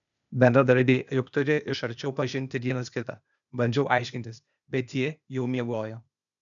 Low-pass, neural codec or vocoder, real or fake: 7.2 kHz; codec, 16 kHz, 0.8 kbps, ZipCodec; fake